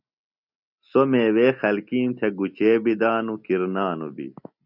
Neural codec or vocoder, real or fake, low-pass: none; real; 5.4 kHz